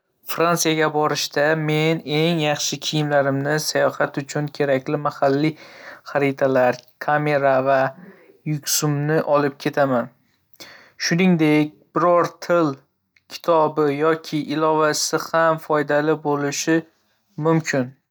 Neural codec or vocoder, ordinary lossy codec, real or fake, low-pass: none; none; real; none